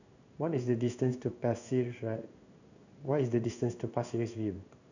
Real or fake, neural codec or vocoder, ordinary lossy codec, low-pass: fake; codec, 16 kHz in and 24 kHz out, 1 kbps, XY-Tokenizer; none; 7.2 kHz